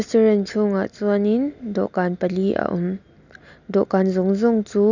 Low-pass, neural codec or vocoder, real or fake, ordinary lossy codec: 7.2 kHz; none; real; none